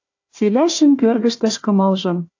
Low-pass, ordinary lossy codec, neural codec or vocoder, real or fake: 7.2 kHz; AAC, 48 kbps; codec, 16 kHz, 1 kbps, FunCodec, trained on Chinese and English, 50 frames a second; fake